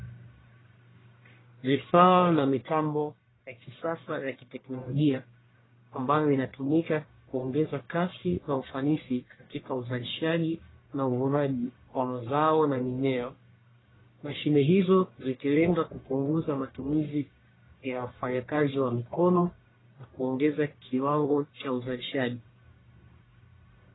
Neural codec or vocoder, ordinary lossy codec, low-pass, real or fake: codec, 44.1 kHz, 1.7 kbps, Pupu-Codec; AAC, 16 kbps; 7.2 kHz; fake